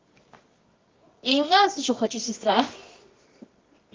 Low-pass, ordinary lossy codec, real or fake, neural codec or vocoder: 7.2 kHz; Opus, 32 kbps; fake; codec, 24 kHz, 0.9 kbps, WavTokenizer, medium music audio release